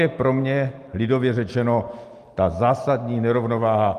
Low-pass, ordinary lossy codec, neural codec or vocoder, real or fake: 14.4 kHz; Opus, 32 kbps; none; real